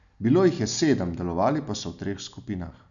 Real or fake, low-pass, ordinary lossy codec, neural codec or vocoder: real; 7.2 kHz; none; none